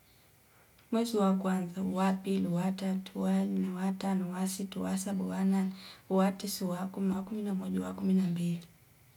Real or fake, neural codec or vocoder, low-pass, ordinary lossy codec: real; none; 19.8 kHz; none